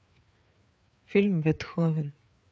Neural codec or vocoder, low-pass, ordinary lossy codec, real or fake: codec, 16 kHz, 4 kbps, FreqCodec, larger model; none; none; fake